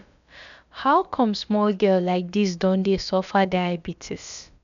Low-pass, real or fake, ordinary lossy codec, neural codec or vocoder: 7.2 kHz; fake; none; codec, 16 kHz, about 1 kbps, DyCAST, with the encoder's durations